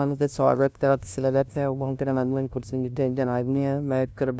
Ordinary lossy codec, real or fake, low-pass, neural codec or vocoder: none; fake; none; codec, 16 kHz, 0.5 kbps, FunCodec, trained on LibriTTS, 25 frames a second